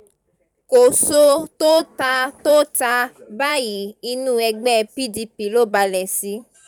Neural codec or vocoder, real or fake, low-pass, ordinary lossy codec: vocoder, 44.1 kHz, 128 mel bands every 512 samples, BigVGAN v2; fake; 19.8 kHz; none